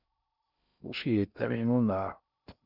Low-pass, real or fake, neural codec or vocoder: 5.4 kHz; fake; codec, 16 kHz in and 24 kHz out, 0.6 kbps, FocalCodec, streaming, 2048 codes